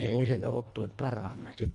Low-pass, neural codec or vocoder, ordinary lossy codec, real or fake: 10.8 kHz; codec, 24 kHz, 1.5 kbps, HILCodec; none; fake